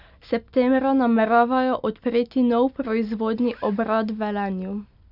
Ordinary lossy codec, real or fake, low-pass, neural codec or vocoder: none; real; 5.4 kHz; none